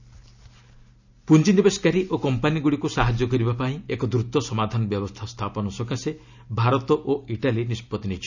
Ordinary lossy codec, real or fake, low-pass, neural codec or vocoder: none; real; 7.2 kHz; none